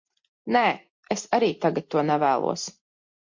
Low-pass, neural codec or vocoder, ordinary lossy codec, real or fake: 7.2 kHz; none; MP3, 48 kbps; real